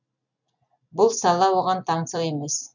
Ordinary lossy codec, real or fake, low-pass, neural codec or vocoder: none; real; 7.2 kHz; none